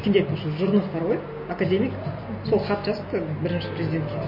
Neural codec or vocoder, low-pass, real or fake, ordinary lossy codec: none; 5.4 kHz; real; MP3, 24 kbps